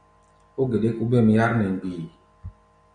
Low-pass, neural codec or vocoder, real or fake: 9.9 kHz; none; real